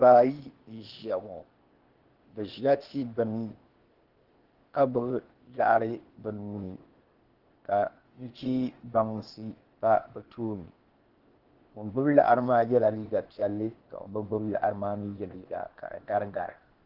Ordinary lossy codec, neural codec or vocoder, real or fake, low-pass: Opus, 16 kbps; codec, 16 kHz, 0.8 kbps, ZipCodec; fake; 5.4 kHz